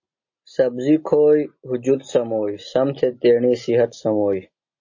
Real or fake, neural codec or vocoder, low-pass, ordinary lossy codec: real; none; 7.2 kHz; MP3, 32 kbps